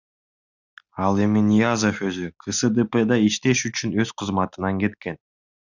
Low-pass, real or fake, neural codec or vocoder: 7.2 kHz; real; none